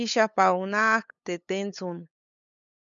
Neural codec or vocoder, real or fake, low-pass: codec, 16 kHz, 8 kbps, FunCodec, trained on LibriTTS, 25 frames a second; fake; 7.2 kHz